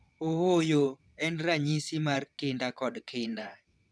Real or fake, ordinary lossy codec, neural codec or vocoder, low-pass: fake; none; vocoder, 22.05 kHz, 80 mel bands, WaveNeXt; none